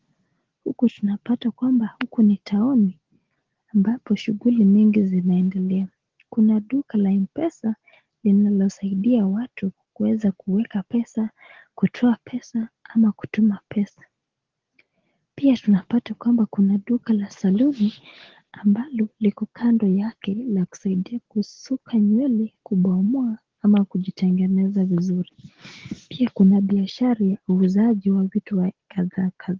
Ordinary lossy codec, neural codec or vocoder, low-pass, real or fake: Opus, 16 kbps; none; 7.2 kHz; real